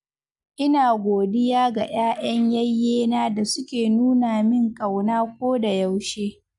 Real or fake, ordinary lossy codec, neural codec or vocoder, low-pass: real; none; none; 10.8 kHz